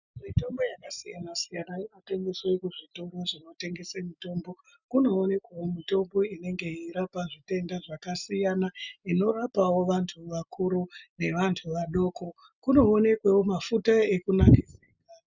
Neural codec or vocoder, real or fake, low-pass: none; real; 7.2 kHz